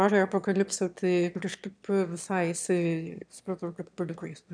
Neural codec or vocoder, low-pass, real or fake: autoencoder, 22.05 kHz, a latent of 192 numbers a frame, VITS, trained on one speaker; 9.9 kHz; fake